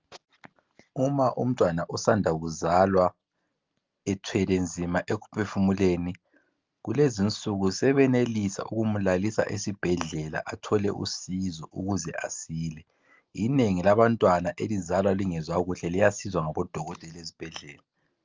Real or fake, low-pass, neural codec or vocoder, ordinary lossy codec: real; 7.2 kHz; none; Opus, 24 kbps